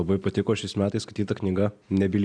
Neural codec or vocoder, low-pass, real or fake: none; 9.9 kHz; real